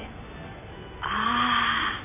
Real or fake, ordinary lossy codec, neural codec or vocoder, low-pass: real; none; none; 3.6 kHz